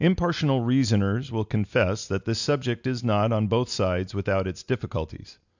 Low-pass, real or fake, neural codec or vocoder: 7.2 kHz; real; none